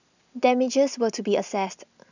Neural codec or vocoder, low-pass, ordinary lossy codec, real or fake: none; 7.2 kHz; none; real